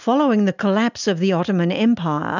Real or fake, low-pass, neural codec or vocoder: real; 7.2 kHz; none